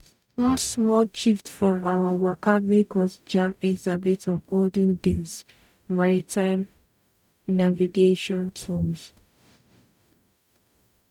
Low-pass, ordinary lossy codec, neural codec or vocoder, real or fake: 19.8 kHz; none; codec, 44.1 kHz, 0.9 kbps, DAC; fake